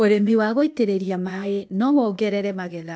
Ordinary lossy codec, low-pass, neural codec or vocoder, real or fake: none; none; codec, 16 kHz, 0.8 kbps, ZipCodec; fake